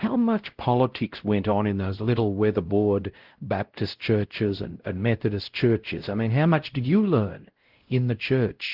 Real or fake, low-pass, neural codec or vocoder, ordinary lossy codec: fake; 5.4 kHz; codec, 16 kHz, 0.5 kbps, X-Codec, WavLM features, trained on Multilingual LibriSpeech; Opus, 16 kbps